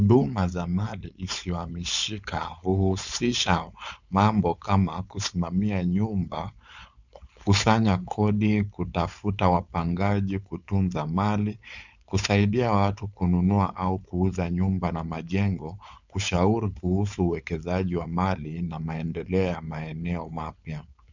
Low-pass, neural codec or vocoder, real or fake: 7.2 kHz; codec, 16 kHz, 4.8 kbps, FACodec; fake